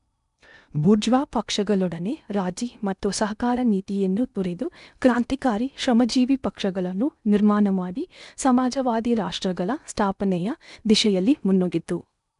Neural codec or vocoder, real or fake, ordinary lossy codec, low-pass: codec, 16 kHz in and 24 kHz out, 0.8 kbps, FocalCodec, streaming, 65536 codes; fake; none; 10.8 kHz